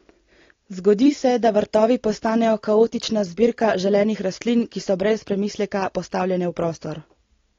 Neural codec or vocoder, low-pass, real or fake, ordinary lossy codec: none; 7.2 kHz; real; AAC, 32 kbps